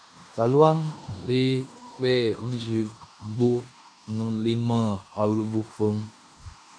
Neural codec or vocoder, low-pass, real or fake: codec, 16 kHz in and 24 kHz out, 0.9 kbps, LongCat-Audio-Codec, fine tuned four codebook decoder; 9.9 kHz; fake